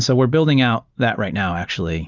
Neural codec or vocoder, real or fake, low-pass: none; real; 7.2 kHz